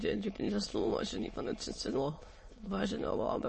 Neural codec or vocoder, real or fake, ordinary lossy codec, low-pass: autoencoder, 22.05 kHz, a latent of 192 numbers a frame, VITS, trained on many speakers; fake; MP3, 32 kbps; 9.9 kHz